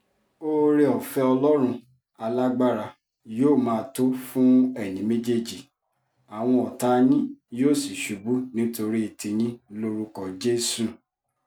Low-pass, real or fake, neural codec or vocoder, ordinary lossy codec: none; real; none; none